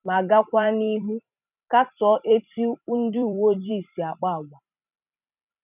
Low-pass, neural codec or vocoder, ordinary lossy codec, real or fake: 3.6 kHz; vocoder, 44.1 kHz, 128 mel bands every 256 samples, BigVGAN v2; none; fake